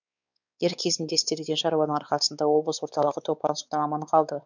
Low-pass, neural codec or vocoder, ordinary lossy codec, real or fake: 7.2 kHz; codec, 16 kHz, 4 kbps, X-Codec, WavLM features, trained on Multilingual LibriSpeech; none; fake